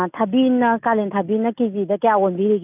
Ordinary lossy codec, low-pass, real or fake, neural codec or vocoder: none; 3.6 kHz; real; none